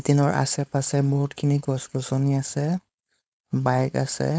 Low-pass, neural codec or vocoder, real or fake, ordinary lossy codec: none; codec, 16 kHz, 4.8 kbps, FACodec; fake; none